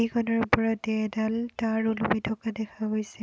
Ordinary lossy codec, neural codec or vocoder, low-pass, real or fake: none; none; none; real